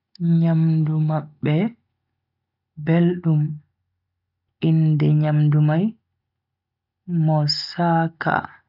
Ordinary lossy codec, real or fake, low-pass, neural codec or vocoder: none; real; 5.4 kHz; none